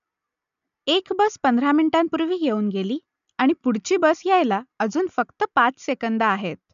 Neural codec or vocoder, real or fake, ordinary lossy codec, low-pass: none; real; none; 7.2 kHz